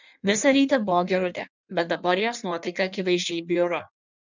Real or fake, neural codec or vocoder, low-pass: fake; codec, 16 kHz in and 24 kHz out, 1.1 kbps, FireRedTTS-2 codec; 7.2 kHz